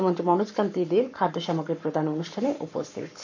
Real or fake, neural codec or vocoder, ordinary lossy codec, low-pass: fake; codec, 44.1 kHz, 7.8 kbps, Pupu-Codec; none; 7.2 kHz